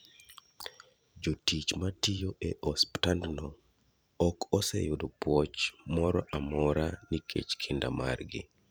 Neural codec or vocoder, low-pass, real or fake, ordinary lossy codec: vocoder, 44.1 kHz, 128 mel bands every 512 samples, BigVGAN v2; none; fake; none